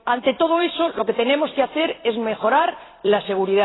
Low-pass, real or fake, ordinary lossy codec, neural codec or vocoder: 7.2 kHz; fake; AAC, 16 kbps; vocoder, 44.1 kHz, 128 mel bands every 256 samples, BigVGAN v2